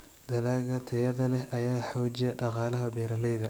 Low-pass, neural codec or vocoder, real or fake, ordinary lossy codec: none; codec, 44.1 kHz, 7.8 kbps, DAC; fake; none